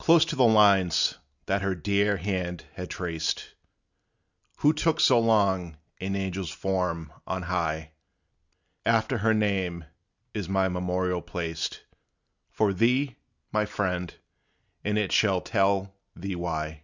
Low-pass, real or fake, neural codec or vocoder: 7.2 kHz; real; none